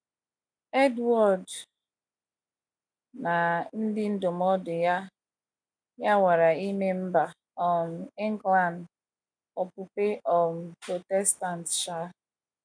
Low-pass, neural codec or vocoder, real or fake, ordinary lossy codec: 9.9 kHz; none; real; none